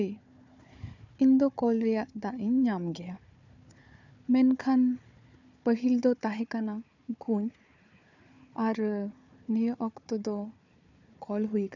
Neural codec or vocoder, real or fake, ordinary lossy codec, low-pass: codec, 16 kHz, 4 kbps, FunCodec, trained on Chinese and English, 50 frames a second; fake; none; 7.2 kHz